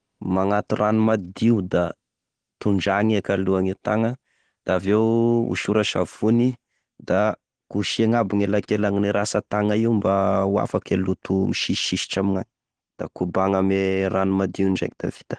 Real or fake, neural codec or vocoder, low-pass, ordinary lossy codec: real; none; 9.9 kHz; Opus, 16 kbps